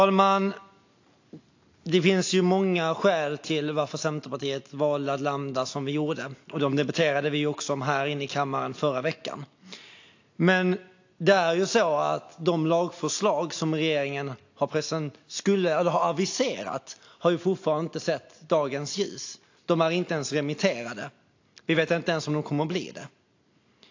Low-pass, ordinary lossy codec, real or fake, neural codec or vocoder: 7.2 kHz; AAC, 48 kbps; real; none